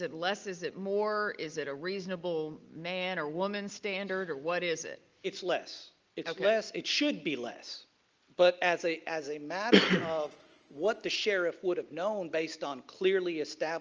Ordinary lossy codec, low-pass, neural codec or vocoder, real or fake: Opus, 24 kbps; 7.2 kHz; none; real